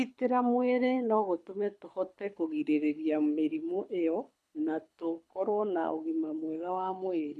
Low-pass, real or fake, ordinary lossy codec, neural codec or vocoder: none; fake; none; codec, 24 kHz, 6 kbps, HILCodec